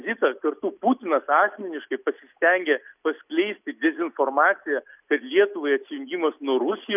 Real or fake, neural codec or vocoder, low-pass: real; none; 3.6 kHz